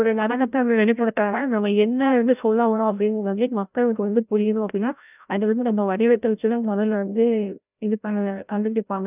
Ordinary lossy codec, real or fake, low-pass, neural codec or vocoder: none; fake; 3.6 kHz; codec, 16 kHz, 0.5 kbps, FreqCodec, larger model